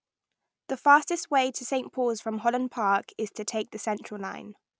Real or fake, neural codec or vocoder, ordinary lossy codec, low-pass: real; none; none; none